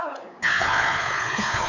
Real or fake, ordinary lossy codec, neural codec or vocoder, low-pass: fake; none; codec, 16 kHz, 4 kbps, X-Codec, WavLM features, trained on Multilingual LibriSpeech; 7.2 kHz